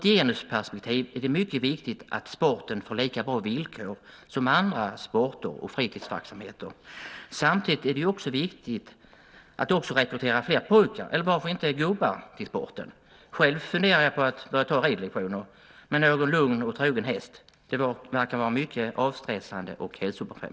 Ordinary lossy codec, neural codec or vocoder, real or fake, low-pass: none; none; real; none